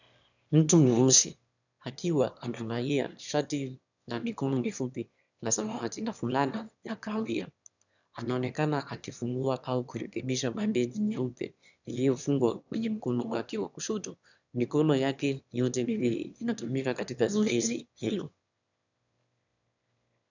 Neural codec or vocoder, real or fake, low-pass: autoencoder, 22.05 kHz, a latent of 192 numbers a frame, VITS, trained on one speaker; fake; 7.2 kHz